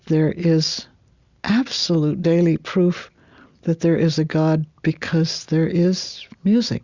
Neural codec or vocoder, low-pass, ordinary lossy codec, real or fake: none; 7.2 kHz; Opus, 64 kbps; real